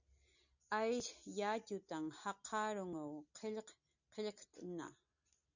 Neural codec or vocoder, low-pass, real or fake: none; 7.2 kHz; real